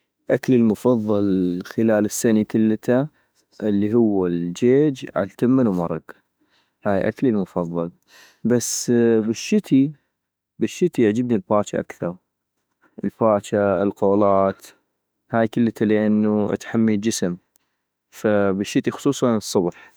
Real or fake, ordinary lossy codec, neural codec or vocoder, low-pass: fake; none; autoencoder, 48 kHz, 32 numbers a frame, DAC-VAE, trained on Japanese speech; none